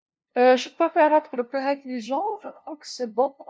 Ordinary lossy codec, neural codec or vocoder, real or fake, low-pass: none; codec, 16 kHz, 0.5 kbps, FunCodec, trained on LibriTTS, 25 frames a second; fake; none